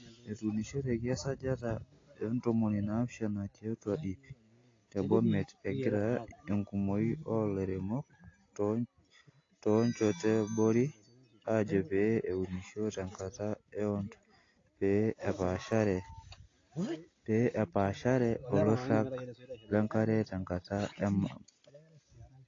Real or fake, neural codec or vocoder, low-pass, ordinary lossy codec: real; none; 7.2 kHz; AAC, 32 kbps